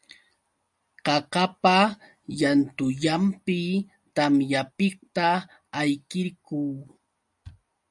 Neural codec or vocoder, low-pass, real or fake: none; 10.8 kHz; real